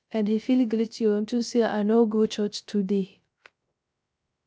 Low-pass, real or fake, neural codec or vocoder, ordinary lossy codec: none; fake; codec, 16 kHz, 0.3 kbps, FocalCodec; none